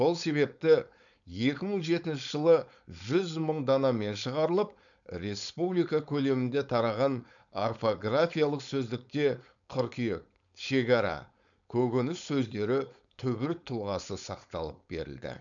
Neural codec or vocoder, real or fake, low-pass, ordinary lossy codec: codec, 16 kHz, 4.8 kbps, FACodec; fake; 7.2 kHz; none